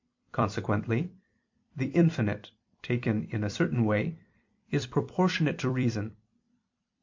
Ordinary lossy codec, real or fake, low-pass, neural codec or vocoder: MP3, 48 kbps; fake; 7.2 kHz; vocoder, 44.1 kHz, 128 mel bands every 256 samples, BigVGAN v2